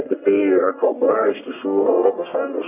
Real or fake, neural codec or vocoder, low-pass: fake; codec, 44.1 kHz, 1.7 kbps, Pupu-Codec; 3.6 kHz